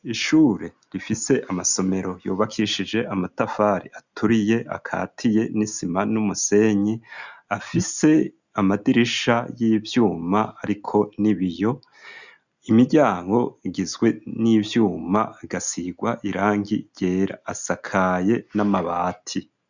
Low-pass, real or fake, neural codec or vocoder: 7.2 kHz; real; none